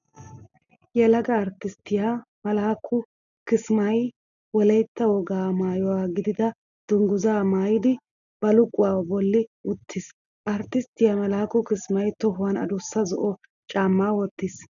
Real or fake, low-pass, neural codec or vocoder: real; 7.2 kHz; none